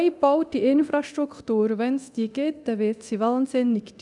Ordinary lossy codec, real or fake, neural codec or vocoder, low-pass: none; fake; codec, 24 kHz, 0.9 kbps, DualCodec; 10.8 kHz